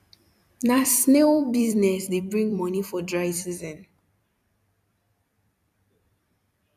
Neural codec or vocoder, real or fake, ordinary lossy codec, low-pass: vocoder, 44.1 kHz, 128 mel bands every 256 samples, BigVGAN v2; fake; none; 14.4 kHz